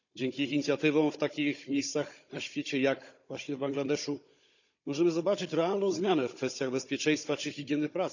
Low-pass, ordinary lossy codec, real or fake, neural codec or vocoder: 7.2 kHz; none; fake; codec, 16 kHz, 16 kbps, FunCodec, trained on Chinese and English, 50 frames a second